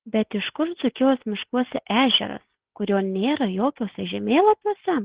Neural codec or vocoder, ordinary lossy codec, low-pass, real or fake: none; Opus, 16 kbps; 3.6 kHz; real